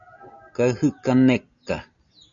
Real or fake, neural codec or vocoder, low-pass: real; none; 7.2 kHz